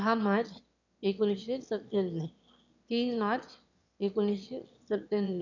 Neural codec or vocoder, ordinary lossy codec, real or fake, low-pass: autoencoder, 22.05 kHz, a latent of 192 numbers a frame, VITS, trained on one speaker; none; fake; 7.2 kHz